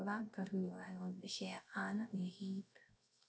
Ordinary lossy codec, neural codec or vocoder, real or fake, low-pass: none; codec, 16 kHz, 0.3 kbps, FocalCodec; fake; none